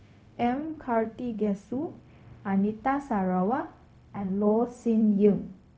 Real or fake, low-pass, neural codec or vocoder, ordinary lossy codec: fake; none; codec, 16 kHz, 0.4 kbps, LongCat-Audio-Codec; none